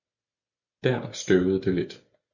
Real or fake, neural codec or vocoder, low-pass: real; none; 7.2 kHz